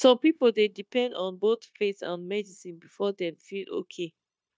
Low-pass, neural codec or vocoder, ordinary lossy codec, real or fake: none; codec, 16 kHz, 0.9 kbps, LongCat-Audio-Codec; none; fake